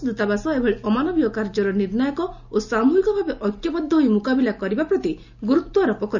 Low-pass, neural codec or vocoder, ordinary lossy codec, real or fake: 7.2 kHz; none; none; real